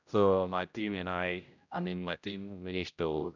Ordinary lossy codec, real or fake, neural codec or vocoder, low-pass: none; fake; codec, 16 kHz, 0.5 kbps, X-Codec, HuBERT features, trained on general audio; 7.2 kHz